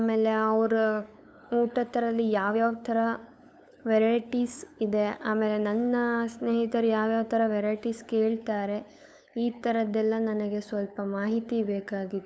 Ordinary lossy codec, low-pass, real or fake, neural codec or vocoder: none; none; fake; codec, 16 kHz, 8 kbps, FunCodec, trained on LibriTTS, 25 frames a second